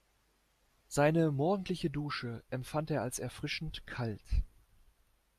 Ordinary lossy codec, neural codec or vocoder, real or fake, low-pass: AAC, 96 kbps; none; real; 14.4 kHz